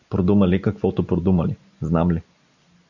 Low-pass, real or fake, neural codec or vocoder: 7.2 kHz; real; none